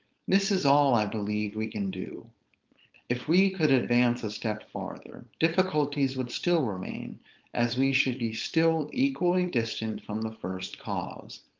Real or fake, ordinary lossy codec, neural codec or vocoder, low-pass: fake; Opus, 24 kbps; codec, 16 kHz, 4.8 kbps, FACodec; 7.2 kHz